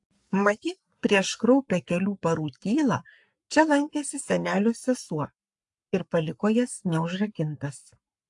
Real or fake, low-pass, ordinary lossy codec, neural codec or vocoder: fake; 10.8 kHz; AAC, 64 kbps; codec, 44.1 kHz, 7.8 kbps, Pupu-Codec